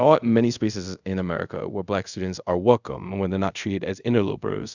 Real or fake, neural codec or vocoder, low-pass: fake; codec, 24 kHz, 0.5 kbps, DualCodec; 7.2 kHz